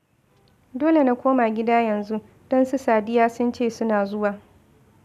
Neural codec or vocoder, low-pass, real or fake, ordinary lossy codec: none; 14.4 kHz; real; none